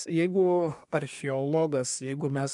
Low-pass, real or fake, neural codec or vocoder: 10.8 kHz; fake; codec, 24 kHz, 1 kbps, SNAC